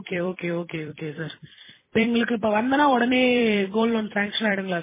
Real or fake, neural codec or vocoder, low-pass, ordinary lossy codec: fake; vocoder, 44.1 kHz, 128 mel bands every 256 samples, BigVGAN v2; 3.6 kHz; MP3, 16 kbps